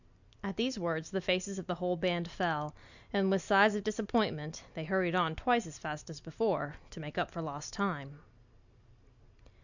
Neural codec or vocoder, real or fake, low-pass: none; real; 7.2 kHz